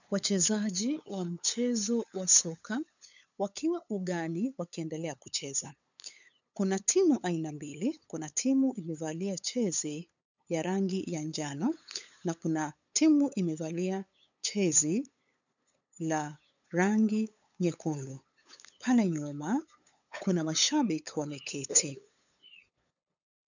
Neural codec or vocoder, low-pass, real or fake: codec, 16 kHz, 8 kbps, FunCodec, trained on LibriTTS, 25 frames a second; 7.2 kHz; fake